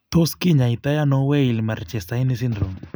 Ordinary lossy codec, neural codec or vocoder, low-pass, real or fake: none; none; none; real